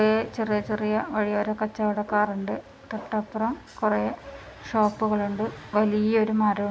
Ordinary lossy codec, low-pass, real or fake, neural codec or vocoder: none; none; real; none